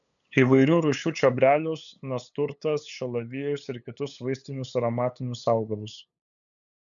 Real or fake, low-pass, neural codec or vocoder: fake; 7.2 kHz; codec, 16 kHz, 8 kbps, FunCodec, trained on LibriTTS, 25 frames a second